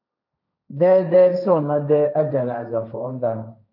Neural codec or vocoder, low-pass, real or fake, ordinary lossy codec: codec, 16 kHz, 1.1 kbps, Voila-Tokenizer; 5.4 kHz; fake; AAC, 48 kbps